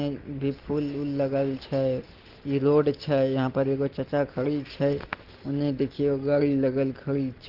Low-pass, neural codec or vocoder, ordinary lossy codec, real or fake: 5.4 kHz; none; Opus, 16 kbps; real